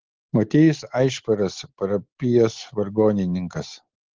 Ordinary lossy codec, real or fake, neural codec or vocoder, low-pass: Opus, 16 kbps; real; none; 7.2 kHz